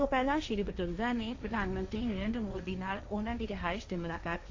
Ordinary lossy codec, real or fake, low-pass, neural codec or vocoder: AAC, 48 kbps; fake; 7.2 kHz; codec, 16 kHz, 1.1 kbps, Voila-Tokenizer